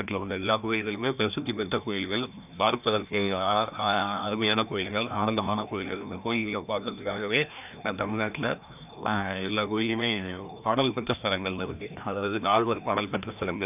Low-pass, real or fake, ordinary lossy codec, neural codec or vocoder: 3.6 kHz; fake; none; codec, 16 kHz, 1 kbps, FreqCodec, larger model